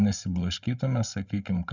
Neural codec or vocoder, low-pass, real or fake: codec, 16 kHz, 16 kbps, FreqCodec, larger model; 7.2 kHz; fake